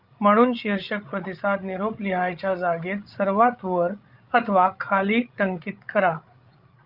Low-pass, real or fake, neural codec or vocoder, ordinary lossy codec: 5.4 kHz; fake; codec, 16 kHz, 16 kbps, FreqCodec, larger model; Opus, 24 kbps